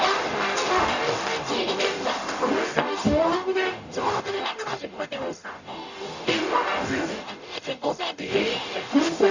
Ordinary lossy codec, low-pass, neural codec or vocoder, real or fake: none; 7.2 kHz; codec, 44.1 kHz, 0.9 kbps, DAC; fake